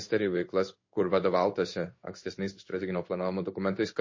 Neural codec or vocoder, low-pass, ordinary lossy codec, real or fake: codec, 16 kHz in and 24 kHz out, 1 kbps, XY-Tokenizer; 7.2 kHz; MP3, 32 kbps; fake